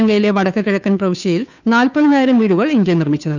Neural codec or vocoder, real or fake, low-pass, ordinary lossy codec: codec, 16 kHz, 2 kbps, FunCodec, trained on Chinese and English, 25 frames a second; fake; 7.2 kHz; none